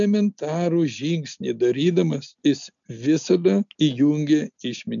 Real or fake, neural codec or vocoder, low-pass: real; none; 7.2 kHz